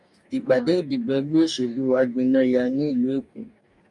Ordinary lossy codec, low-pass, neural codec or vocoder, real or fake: MP3, 96 kbps; 10.8 kHz; codec, 44.1 kHz, 2.6 kbps, DAC; fake